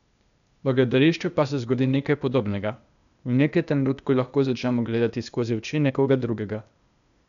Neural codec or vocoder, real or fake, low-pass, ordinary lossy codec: codec, 16 kHz, 0.8 kbps, ZipCodec; fake; 7.2 kHz; none